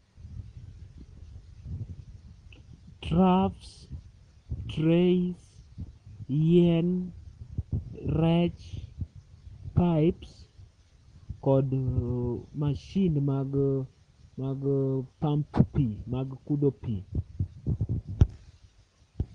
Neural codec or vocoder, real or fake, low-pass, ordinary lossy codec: none; real; 9.9 kHz; Opus, 24 kbps